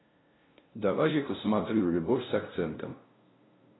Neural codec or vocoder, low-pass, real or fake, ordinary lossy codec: codec, 16 kHz, 0.5 kbps, FunCodec, trained on LibriTTS, 25 frames a second; 7.2 kHz; fake; AAC, 16 kbps